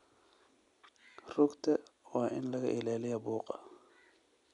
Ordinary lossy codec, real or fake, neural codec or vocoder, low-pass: none; real; none; 10.8 kHz